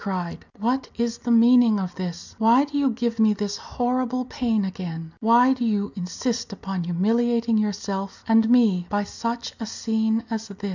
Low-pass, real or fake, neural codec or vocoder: 7.2 kHz; real; none